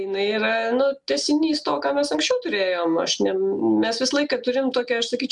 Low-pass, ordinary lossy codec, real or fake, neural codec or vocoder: 10.8 kHz; MP3, 96 kbps; real; none